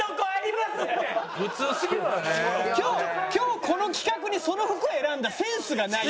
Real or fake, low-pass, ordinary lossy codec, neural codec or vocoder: real; none; none; none